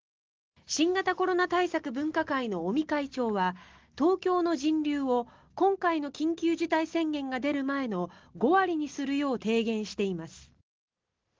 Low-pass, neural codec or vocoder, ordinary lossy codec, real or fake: 7.2 kHz; none; Opus, 16 kbps; real